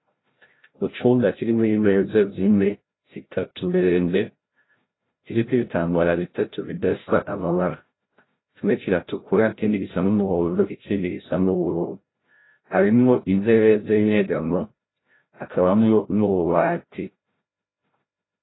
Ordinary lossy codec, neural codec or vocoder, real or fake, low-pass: AAC, 16 kbps; codec, 16 kHz, 0.5 kbps, FreqCodec, larger model; fake; 7.2 kHz